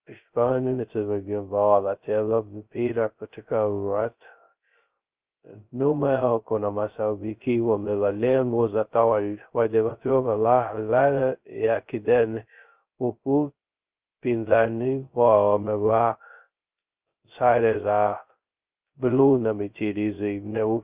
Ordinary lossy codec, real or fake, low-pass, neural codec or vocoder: Opus, 32 kbps; fake; 3.6 kHz; codec, 16 kHz, 0.2 kbps, FocalCodec